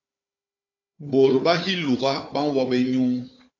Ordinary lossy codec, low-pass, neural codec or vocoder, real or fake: AAC, 48 kbps; 7.2 kHz; codec, 16 kHz, 4 kbps, FunCodec, trained on Chinese and English, 50 frames a second; fake